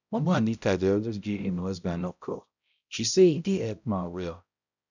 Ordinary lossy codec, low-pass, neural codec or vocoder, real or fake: none; 7.2 kHz; codec, 16 kHz, 0.5 kbps, X-Codec, HuBERT features, trained on balanced general audio; fake